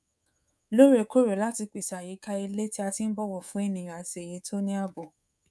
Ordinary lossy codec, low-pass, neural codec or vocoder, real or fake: none; none; codec, 24 kHz, 3.1 kbps, DualCodec; fake